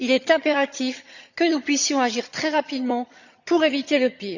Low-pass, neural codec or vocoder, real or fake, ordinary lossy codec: 7.2 kHz; vocoder, 22.05 kHz, 80 mel bands, HiFi-GAN; fake; Opus, 64 kbps